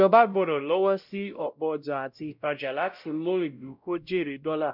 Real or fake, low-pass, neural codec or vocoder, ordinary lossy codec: fake; 5.4 kHz; codec, 16 kHz, 0.5 kbps, X-Codec, WavLM features, trained on Multilingual LibriSpeech; none